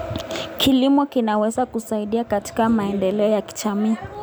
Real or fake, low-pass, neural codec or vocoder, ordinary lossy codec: real; none; none; none